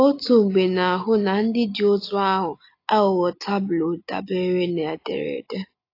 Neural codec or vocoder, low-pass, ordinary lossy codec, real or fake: none; 5.4 kHz; AAC, 32 kbps; real